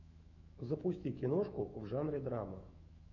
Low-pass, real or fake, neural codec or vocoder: 7.2 kHz; fake; autoencoder, 48 kHz, 128 numbers a frame, DAC-VAE, trained on Japanese speech